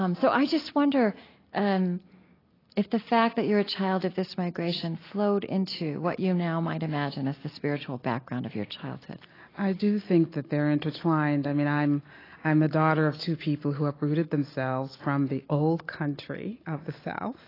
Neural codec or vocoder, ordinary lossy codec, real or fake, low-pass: none; AAC, 24 kbps; real; 5.4 kHz